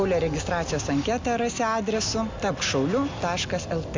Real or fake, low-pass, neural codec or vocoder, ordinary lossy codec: real; 7.2 kHz; none; MP3, 64 kbps